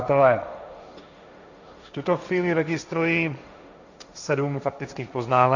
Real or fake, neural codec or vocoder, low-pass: fake; codec, 16 kHz, 1.1 kbps, Voila-Tokenizer; 7.2 kHz